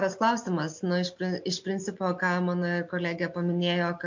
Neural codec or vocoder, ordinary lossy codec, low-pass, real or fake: none; MP3, 48 kbps; 7.2 kHz; real